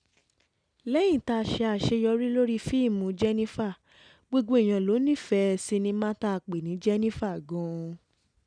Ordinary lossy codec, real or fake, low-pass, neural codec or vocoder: none; real; 9.9 kHz; none